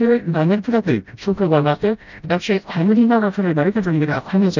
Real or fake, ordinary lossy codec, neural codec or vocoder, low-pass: fake; none; codec, 16 kHz, 0.5 kbps, FreqCodec, smaller model; 7.2 kHz